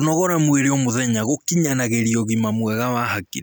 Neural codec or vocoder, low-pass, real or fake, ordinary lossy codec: none; none; real; none